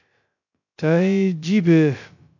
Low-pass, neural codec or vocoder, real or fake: 7.2 kHz; codec, 16 kHz, 0.2 kbps, FocalCodec; fake